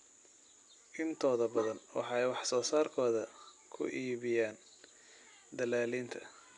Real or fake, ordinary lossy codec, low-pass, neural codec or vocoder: real; none; 10.8 kHz; none